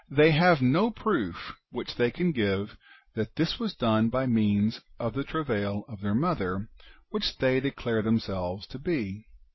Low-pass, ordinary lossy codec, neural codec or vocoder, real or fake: 7.2 kHz; MP3, 24 kbps; none; real